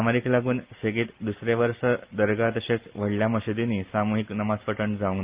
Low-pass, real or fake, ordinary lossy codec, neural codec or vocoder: 3.6 kHz; real; Opus, 32 kbps; none